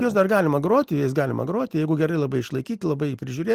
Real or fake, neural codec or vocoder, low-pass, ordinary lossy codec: real; none; 14.4 kHz; Opus, 24 kbps